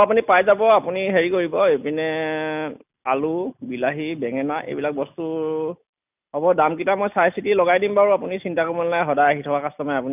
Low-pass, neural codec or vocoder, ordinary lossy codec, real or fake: 3.6 kHz; none; none; real